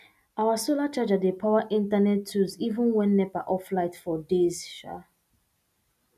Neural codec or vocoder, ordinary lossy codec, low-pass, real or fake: none; none; 14.4 kHz; real